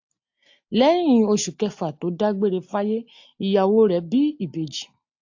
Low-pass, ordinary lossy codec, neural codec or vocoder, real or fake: 7.2 kHz; AAC, 48 kbps; none; real